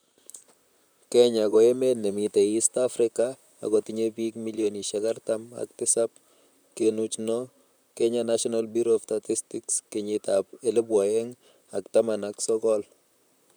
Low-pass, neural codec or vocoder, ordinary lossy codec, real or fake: none; vocoder, 44.1 kHz, 128 mel bands, Pupu-Vocoder; none; fake